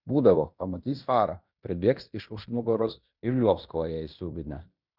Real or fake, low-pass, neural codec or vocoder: fake; 5.4 kHz; codec, 16 kHz in and 24 kHz out, 0.9 kbps, LongCat-Audio-Codec, fine tuned four codebook decoder